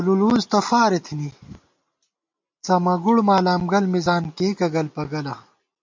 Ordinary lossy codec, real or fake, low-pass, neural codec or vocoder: MP3, 48 kbps; real; 7.2 kHz; none